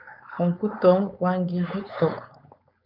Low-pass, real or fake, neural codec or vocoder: 5.4 kHz; fake; codec, 16 kHz, 4.8 kbps, FACodec